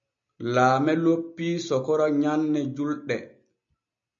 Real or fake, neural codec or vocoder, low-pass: real; none; 7.2 kHz